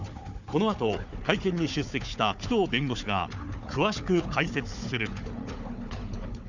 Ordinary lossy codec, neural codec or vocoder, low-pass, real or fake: none; codec, 16 kHz, 4 kbps, FunCodec, trained on Chinese and English, 50 frames a second; 7.2 kHz; fake